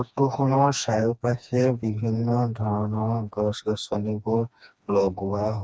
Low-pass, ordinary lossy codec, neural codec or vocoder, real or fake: none; none; codec, 16 kHz, 2 kbps, FreqCodec, smaller model; fake